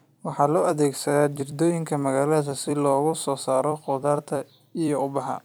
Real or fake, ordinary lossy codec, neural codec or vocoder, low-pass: fake; none; vocoder, 44.1 kHz, 128 mel bands every 256 samples, BigVGAN v2; none